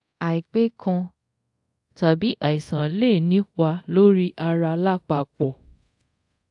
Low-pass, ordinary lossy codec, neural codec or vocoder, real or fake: 10.8 kHz; AAC, 64 kbps; codec, 24 kHz, 0.5 kbps, DualCodec; fake